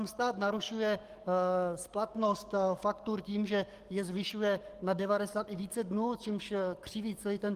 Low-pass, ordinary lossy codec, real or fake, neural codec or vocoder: 14.4 kHz; Opus, 24 kbps; fake; codec, 44.1 kHz, 7.8 kbps, DAC